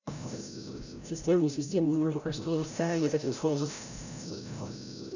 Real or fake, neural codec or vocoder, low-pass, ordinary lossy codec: fake; codec, 16 kHz, 0.5 kbps, FreqCodec, larger model; 7.2 kHz; none